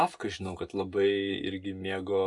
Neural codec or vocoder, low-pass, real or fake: none; 10.8 kHz; real